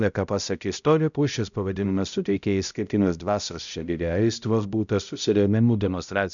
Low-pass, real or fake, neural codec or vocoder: 7.2 kHz; fake; codec, 16 kHz, 0.5 kbps, X-Codec, HuBERT features, trained on balanced general audio